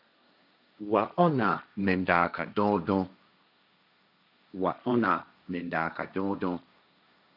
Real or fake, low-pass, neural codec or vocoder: fake; 5.4 kHz; codec, 16 kHz, 1.1 kbps, Voila-Tokenizer